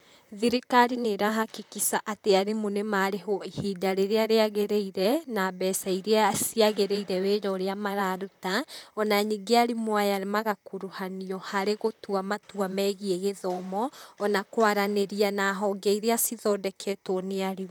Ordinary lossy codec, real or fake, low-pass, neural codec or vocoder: none; fake; none; vocoder, 44.1 kHz, 128 mel bands, Pupu-Vocoder